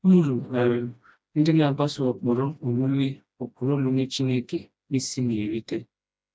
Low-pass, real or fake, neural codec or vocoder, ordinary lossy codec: none; fake; codec, 16 kHz, 1 kbps, FreqCodec, smaller model; none